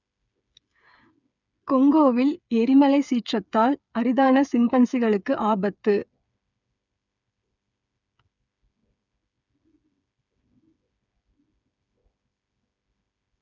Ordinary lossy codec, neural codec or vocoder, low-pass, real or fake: none; codec, 16 kHz, 8 kbps, FreqCodec, smaller model; 7.2 kHz; fake